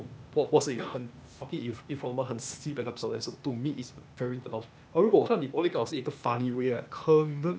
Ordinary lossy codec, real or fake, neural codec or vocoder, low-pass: none; fake; codec, 16 kHz, 0.8 kbps, ZipCodec; none